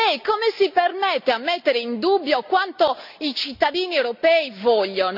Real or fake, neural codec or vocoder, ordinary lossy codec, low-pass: real; none; none; 5.4 kHz